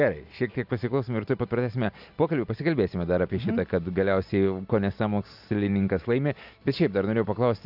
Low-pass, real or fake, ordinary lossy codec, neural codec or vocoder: 5.4 kHz; real; AAC, 48 kbps; none